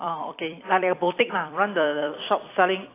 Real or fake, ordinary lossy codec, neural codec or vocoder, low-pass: fake; AAC, 24 kbps; vocoder, 44.1 kHz, 128 mel bands every 256 samples, BigVGAN v2; 3.6 kHz